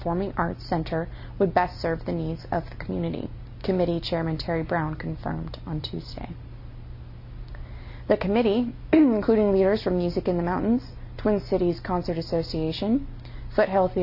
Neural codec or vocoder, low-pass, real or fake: none; 5.4 kHz; real